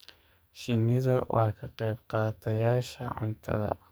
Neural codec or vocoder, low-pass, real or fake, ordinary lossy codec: codec, 44.1 kHz, 2.6 kbps, SNAC; none; fake; none